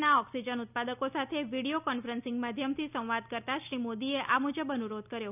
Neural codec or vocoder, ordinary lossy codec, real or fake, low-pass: none; none; real; 3.6 kHz